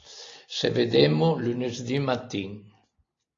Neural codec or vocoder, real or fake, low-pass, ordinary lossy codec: none; real; 7.2 kHz; AAC, 32 kbps